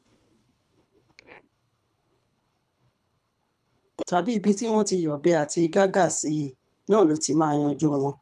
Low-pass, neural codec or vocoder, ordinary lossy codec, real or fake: none; codec, 24 kHz, 3 kbps, HILCodec; none; fake